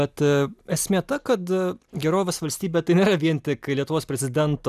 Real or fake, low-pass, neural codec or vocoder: real; 14.4 kHz; none